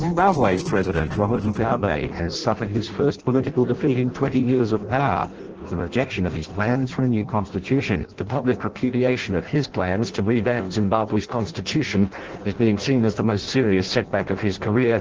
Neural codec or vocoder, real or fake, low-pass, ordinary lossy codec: codec, 16 kHz in and 24 kHz out, 0.6 kbps, FireRedTTS-2 codec; fake; 7.2 kHz; Opus, 16 kbps